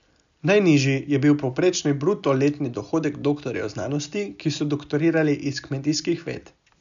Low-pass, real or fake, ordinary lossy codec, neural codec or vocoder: 7.2 kHz; real; none; none